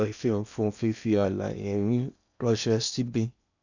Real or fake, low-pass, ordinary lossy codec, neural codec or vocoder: fake; 7.2 kHz; none; codec, 16 kHz in and 24 kHz out, 0.8 kbps, FocalCodec, streaming, 65536 codes